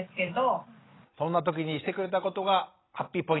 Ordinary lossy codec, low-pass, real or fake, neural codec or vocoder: AAC, 16 kbps; 7.2 kHz; real; none